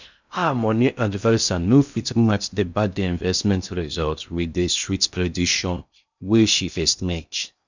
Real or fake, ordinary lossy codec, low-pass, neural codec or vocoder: fake; none; 7.2 kHz; codec, 16 kHz in and 24 kHz out, 0.6 kbps, FocalCodec, streaming, 2048 codes